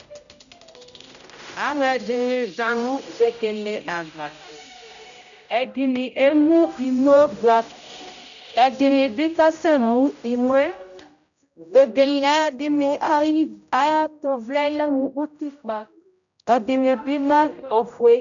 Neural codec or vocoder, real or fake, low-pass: codec, 16 kHz, 0.5 kbps, X-Codec, HuBERT features, trained on general audio; fake; 7.2 kHz